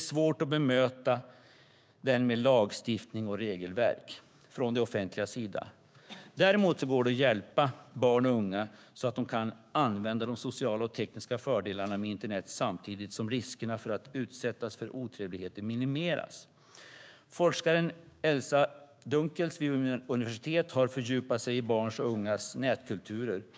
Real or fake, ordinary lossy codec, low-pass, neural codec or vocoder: fake; none; none; codec, 16 kHz, 6 kbps, DAC